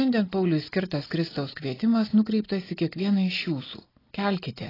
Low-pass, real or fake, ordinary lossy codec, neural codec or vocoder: 5.4 kHz; real; AAC, 24 kbps; none